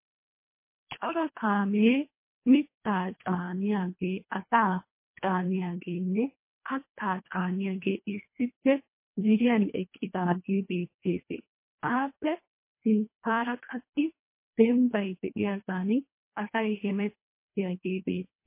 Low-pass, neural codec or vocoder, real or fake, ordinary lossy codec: 3.6 kHz; codec, 24 kHz, 1.5 kbps, HILCodec; fake; MP3, 24 kbps